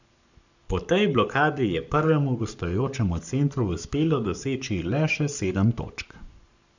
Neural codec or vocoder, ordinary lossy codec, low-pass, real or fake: codec, 44.1 kHz, 7.8 kbps, Pupu-Codec; none; 7.2 kHz; fake